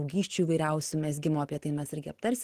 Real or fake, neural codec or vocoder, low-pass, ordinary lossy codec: real; none; 14.4 kHz; Opus, 16 kbps